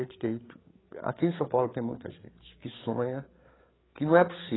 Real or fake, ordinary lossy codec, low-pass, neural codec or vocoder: fake; AAC, 16 kbps; 7.2 kHz; codec, 16 kHz, 8 kbps, FreqCodec, larger model